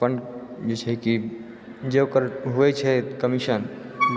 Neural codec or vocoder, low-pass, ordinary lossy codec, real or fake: none; none; none; real